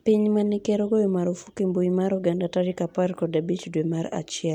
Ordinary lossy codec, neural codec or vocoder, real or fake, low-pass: none; none; real; 19.8 kHz